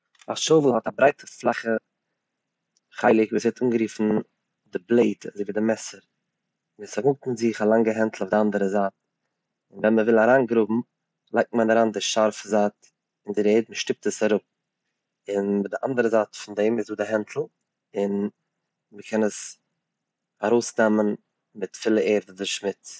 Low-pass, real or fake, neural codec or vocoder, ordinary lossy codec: none; real; none; none